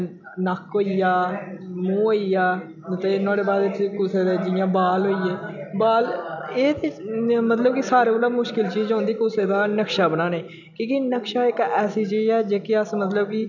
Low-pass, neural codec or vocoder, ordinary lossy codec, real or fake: 7.2 kHz; none; none; real